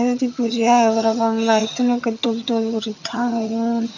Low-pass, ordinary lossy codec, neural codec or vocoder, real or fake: 7.2 kHz; none; vocoder, 22.05 kHz, 80 mel bands, HiFi-GAN; fake